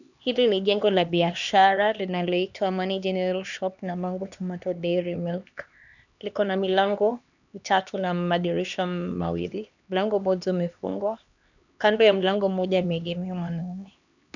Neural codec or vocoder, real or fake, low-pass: codec, 16 kHz, 2 kbps, X-Codec, HuBERT features, trained on LibriSpeech; fake; 7.2 kHz